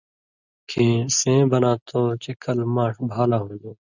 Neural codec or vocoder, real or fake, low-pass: none; real; 7.2 kHz